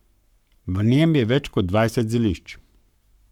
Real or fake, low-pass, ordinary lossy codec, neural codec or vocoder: fake; 19.8 kHz; none; codec, 44.1 kHz, 7.8 kbps, Pupu-Codec